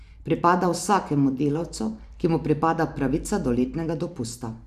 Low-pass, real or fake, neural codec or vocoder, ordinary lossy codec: 14.4 kHz; fake; vocoder, 44.1 kHz, 128 mel bands every 512 samples, BigVGAN v2; none